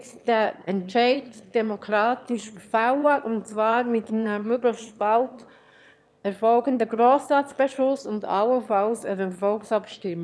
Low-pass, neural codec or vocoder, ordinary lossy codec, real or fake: none; autoencoder, 22.05 kHz, a latent of 192 numbers a frame, VITS, trained on one speaker; none; fake